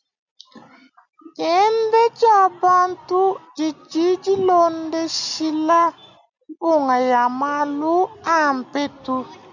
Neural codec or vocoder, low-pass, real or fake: none; 7.2 kHz; real